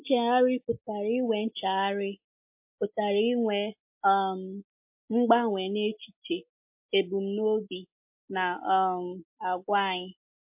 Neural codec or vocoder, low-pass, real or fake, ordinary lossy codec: none; 3.6 kHz; real; MP3, 32 kbps